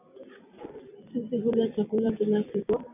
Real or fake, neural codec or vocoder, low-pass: fake; vocoder, 44.1 kHz, 128 mel bands every 256 samples, BigVGAN v2; 3.6 kHz